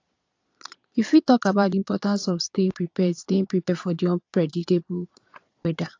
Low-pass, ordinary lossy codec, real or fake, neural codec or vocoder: 7.2 kHz; AAC, 48 kbps; fake; vocoder, 22.05 kHz, 80 mel bands, Vocos